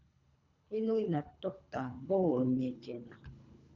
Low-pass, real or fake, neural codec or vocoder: 7.2 kHz; fake; codec, 24 kHz, 3 kbps, HILCodec